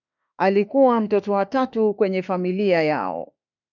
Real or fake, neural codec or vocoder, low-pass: fake; autoencoder, 48 kHz, 32 numbers a frame, DAC-VAE, trained on Japanese speech; 7.2 kHz